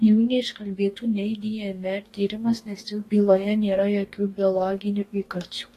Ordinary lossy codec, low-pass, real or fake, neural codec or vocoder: AAC, 48 kbps; 14.4 kHz; fake; codec, 44.1 kHz, 2.6 kbps, DAC